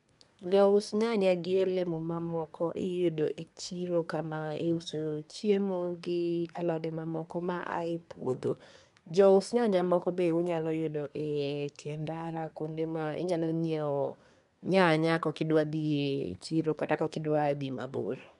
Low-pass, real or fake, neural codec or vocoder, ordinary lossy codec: 10.8 kHz; fake; codec, 24 kHz, 1 kbps, SNAC; none